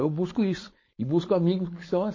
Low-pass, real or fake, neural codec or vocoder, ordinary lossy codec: 7.2 kHz; fake; codec, 16 kHz, 4.8 kbps, FACodec; MP3, 32 kbps